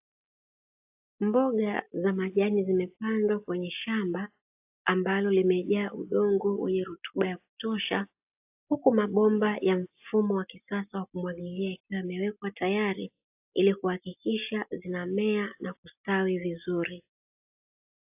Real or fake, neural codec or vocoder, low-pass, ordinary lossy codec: real; none; 3.6 kHz; AAC, 32 kbps